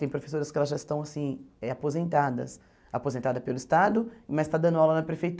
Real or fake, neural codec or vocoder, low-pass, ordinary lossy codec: real; none; none; none